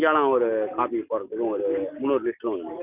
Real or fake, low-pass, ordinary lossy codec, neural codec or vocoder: real; 3.6 kHz; none; none